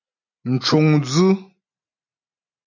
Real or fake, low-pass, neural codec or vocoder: real; 7.2 kHz; none